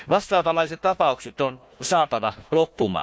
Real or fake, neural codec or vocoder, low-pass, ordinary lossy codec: fake; codec, 16 kHz, 1 kbps, FunCodec, trained on Chinese and English, 50 frames a second; none; none